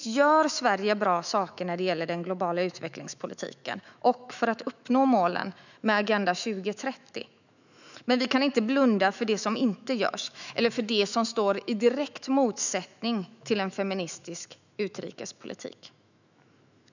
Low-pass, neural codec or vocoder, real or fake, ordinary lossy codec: 7.2 kHz; autoencoder, 48 kHz, 128 numbers a frame, DAC-VAE, trained on Japanese speech; fake; none